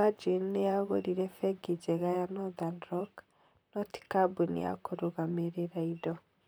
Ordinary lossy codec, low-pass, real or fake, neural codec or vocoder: none; none; fake; vocoder, 44.1 kHz, 128 mel bands, Pupu-Vocoder